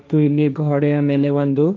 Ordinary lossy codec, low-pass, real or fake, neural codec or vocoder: none; none; fake; codec, 16 kHz, 1.1 kbps, Voila-Tokenizer